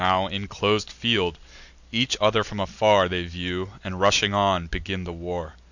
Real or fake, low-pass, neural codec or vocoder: real; 7.2 kHz; none